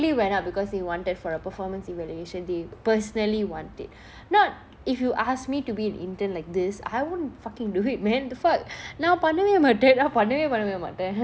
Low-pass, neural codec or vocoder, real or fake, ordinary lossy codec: none; none; real; none